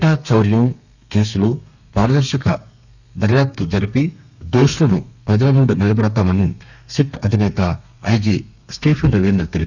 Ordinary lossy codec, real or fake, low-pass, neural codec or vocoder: none; fake; 7.2 kHz; codec, 32 kHz, 1.9 kbps, SNAC